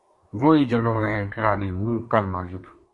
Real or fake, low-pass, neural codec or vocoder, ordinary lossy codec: fake; 10.8 kHz; codec, 24 kHz, 1 kbps, SNAC; MP3, 48 kbps